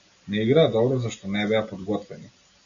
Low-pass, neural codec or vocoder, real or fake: 7.2 kHz; none; real